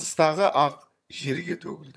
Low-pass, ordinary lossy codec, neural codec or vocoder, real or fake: none; none; vocoder, 22.05 kHz, 80 mel bands, HiFi-GAN; fake